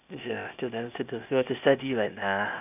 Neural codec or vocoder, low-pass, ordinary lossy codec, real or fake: codec, 16 kHz, 0.8 kbps, ZipCodec; 3.6 kHz; none; fake